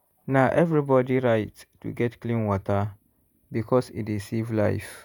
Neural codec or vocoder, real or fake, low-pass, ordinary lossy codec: vocoder, 48 kHz, 128 mel bands, Vocos; fake; none; none